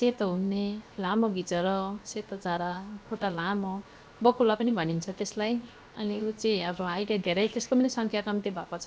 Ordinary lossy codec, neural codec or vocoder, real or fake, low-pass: none; codec, 16 kHz, 0.7 kbps, FocalCodec; fake; none